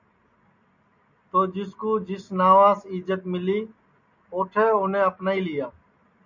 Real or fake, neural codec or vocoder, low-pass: real; none; 7.2 kHz